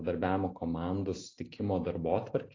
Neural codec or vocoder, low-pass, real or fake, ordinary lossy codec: none; 7.2 kHz; real; AAC, 32 kbps